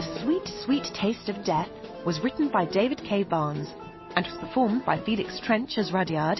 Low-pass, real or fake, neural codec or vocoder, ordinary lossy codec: 7.2 kHz; real; none; MP3, 24 kbps